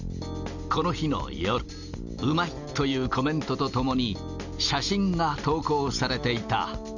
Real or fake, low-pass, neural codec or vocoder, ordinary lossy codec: real; 7.2 kHz; none; none